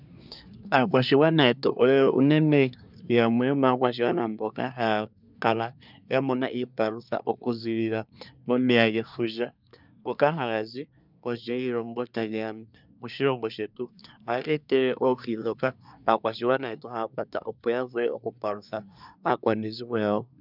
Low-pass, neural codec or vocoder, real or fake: 5.4 kHz; codec, 24 kHz, 1 kbps, SNAC; fake